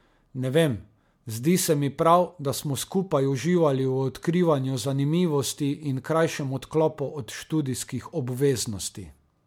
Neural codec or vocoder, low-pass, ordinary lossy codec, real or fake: none; 19.8 kHz; MP3, 96 kbps; real